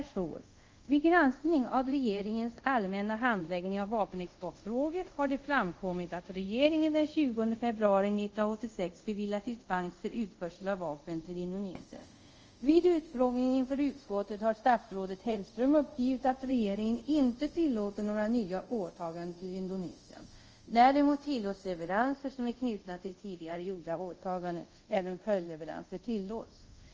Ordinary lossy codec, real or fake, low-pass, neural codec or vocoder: Opus, 24 kbps; fake; 7.2 kHz; codec, 24 kHz, 0.5 kbps, DualCodec